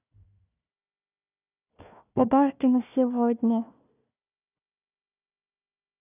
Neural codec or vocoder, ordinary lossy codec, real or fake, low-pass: codec, 16 kHz, 1 kbps, FunCodec, trained on Chinese and English, 50 frames a second; none; fake; 3.6 kHz